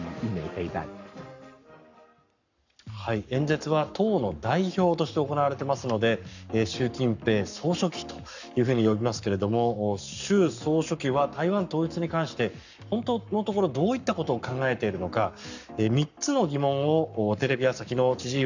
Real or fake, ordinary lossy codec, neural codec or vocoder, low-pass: fake; none; codec, 44.1 kHz, 7.8 kbps, Pupu-Codec; 7.2 kHz